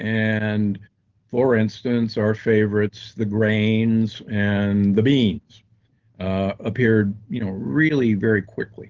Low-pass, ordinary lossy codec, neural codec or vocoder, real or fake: 7.2 kHz; Opus, 16 kbps; none; real